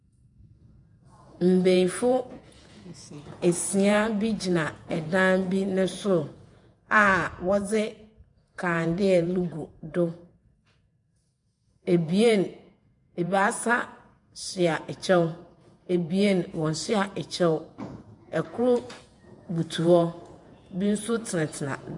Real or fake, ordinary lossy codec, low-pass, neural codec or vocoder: fake; MP3, 64 kbps; 10.8 kHz; vocoder, 48 kHz, 128 mel bands, Vocos